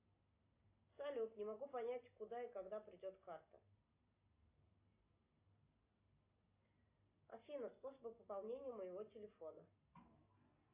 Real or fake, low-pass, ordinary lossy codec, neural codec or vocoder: real; 3.6 kHz; AAC, 32 kbps; none